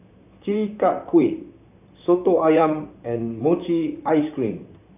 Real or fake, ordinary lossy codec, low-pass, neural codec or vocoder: fake; none; 3.6 kHz; vocoder, 44.1 kHz, 128 mel bands, Pupu-Vocoder